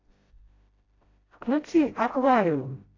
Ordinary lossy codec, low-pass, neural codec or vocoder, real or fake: AAC, 32 kbps; 7.2 kHz; codec, 16 kHz, 0.5 kbps, FreqCodec, smaller model; fake